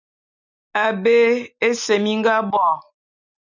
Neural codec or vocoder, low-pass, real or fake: none; 7.2 kHz; real